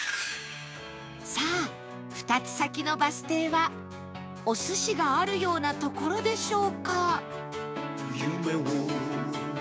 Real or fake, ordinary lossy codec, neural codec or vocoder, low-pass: fake; none; codec, 16 kHz, 6 kbps, DAC; none